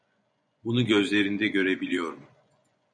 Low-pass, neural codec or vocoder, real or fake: 9.9 kHz; vocoder, 24 kHz, 100 mel bands, Vocos; fake